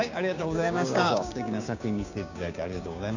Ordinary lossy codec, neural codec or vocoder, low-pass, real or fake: none; codec, 16 kHz, 6 kbps, DAC; 7.2 kHz; fake